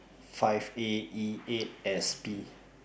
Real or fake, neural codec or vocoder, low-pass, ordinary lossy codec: real; none; none; none